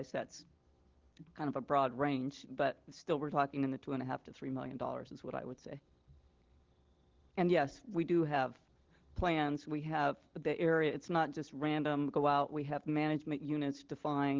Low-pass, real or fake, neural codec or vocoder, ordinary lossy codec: 7.2 kHz; real; none; Opus, 16 kbps